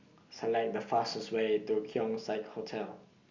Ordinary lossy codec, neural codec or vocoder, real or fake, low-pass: Opus, 64 kbps; none; real; 7.2 kHz